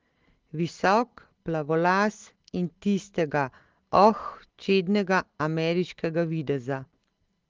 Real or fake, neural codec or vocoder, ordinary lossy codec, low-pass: real; none; Opus, 16 kbps; 7.2 kHz